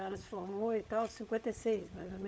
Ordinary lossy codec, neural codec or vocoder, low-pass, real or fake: none; codec, 16 kHz, 16 kbps, FunCodec, trained on LibriTTS, 50 frames a second; none; fake